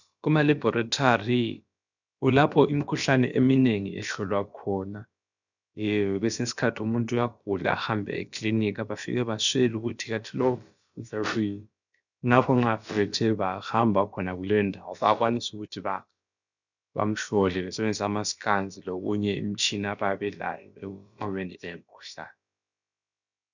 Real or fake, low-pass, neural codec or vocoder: fake; 7.2 kHz; codec, 16 kHz, about 1 kbps, DyCAST, with the encoder's durations